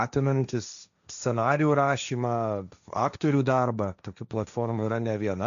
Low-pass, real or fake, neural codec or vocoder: 7.2 kHz; fake; codec, 16 kHz, 1.1 kbps, Voila-Tokenizer